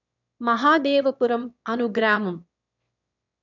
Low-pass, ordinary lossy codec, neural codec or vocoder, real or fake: 7.2 kHz; none; autoencoder, 22.05 kHz, a latent of 192 numbers a frame, VITS, trained on one speaker; fake